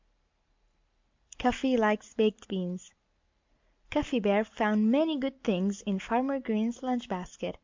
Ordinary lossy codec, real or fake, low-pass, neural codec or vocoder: MP3, 64 kbps; real; 7.2 kHz; none